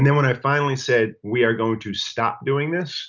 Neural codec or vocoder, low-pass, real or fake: none; 7.2 kHz; real